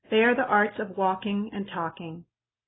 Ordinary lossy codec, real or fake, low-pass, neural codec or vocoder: AAC, 16 kbps; real; 7.2 kHz; none